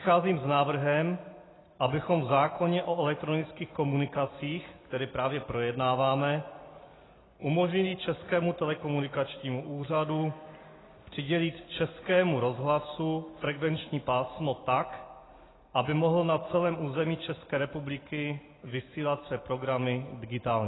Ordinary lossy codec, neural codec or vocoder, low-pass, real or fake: AAC, 16 kbps; none; 7.2 kHz; real